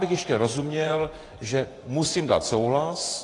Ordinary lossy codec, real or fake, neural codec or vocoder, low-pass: AAC, 32 kbps; fake; vocoder, 24 kHz, 100 mel bands, Vocos; 10.8 kHz